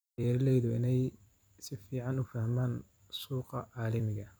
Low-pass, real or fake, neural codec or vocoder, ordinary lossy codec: none; fake; vocoder, 44.1 kHz, 128 mel bands every 256 samples, BigVGAN v2; none